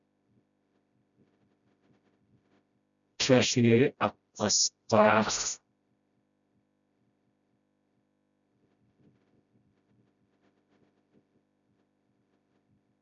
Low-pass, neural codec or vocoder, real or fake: 7.2 kHz; codec, 16 kHz, 0.5 kbps, FreqCodec, smaller model; fake